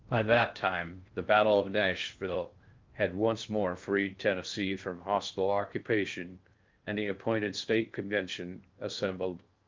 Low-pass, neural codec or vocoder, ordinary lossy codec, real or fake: 7.2 kHz; codec, 16 kHz in and 24 kHz out, 0.6 kbps, FocalCodec, streaming, 4096 codes; Opus, 32 kbps; fake